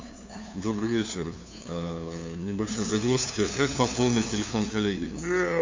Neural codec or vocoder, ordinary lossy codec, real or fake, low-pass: codec, 16 kHz, 2 kbps, FunCodec, trained on LibriTTS, 25 frames a second; MP3, 64 kbps; fake; 7.2 kHz